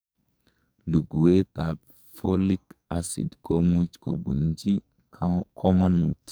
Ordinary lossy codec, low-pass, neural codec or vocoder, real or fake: none; none; codec, 44.1 kHz, 2.6 kbps, SNAC; fake